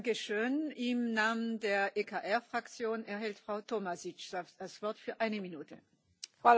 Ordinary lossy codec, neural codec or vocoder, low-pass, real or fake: none; none; none; real